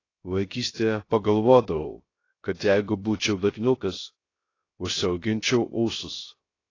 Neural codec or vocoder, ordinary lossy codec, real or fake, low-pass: codec, 16 kHz, 0.3 kbps, FocalCodec; AAC, 32 kbps; fake; 7.2 kHz